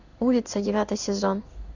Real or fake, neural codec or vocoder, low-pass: fake; codec, 16 kHz in and 24 kHz out, 1 kbps, XY-Tokenizer; 7.2 kHz